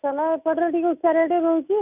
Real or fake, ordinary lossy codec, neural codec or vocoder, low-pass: real; none; none; 3.6 kHz